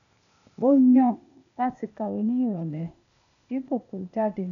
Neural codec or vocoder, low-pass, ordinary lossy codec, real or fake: codec, 16 kHz, 0.8 kbps, ZipCodec; 7.2 kHz; none; fake